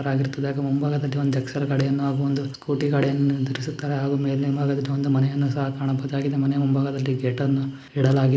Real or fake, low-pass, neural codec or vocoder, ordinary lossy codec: real; none; none; none